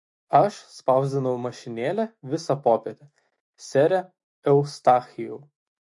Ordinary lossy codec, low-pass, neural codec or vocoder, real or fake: MP3, 48 kbps; 10.8 kHz; autoencoder, 48 kHz, 128 numbers a frame, DAC-VAE, trained on Japanese speech; fake